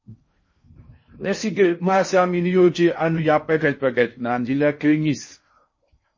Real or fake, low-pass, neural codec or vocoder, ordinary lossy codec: fake; 7.2 kHz; codec, 16 kHz in and 24 kHz out, 0.6 kbps, FocalCodec, streaming, 4096 codes; MP3, 32 kbps